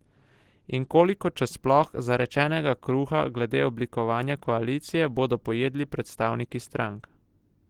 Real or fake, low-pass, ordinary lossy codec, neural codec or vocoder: real; 19.8 kHz; Opus, 16 kbps; none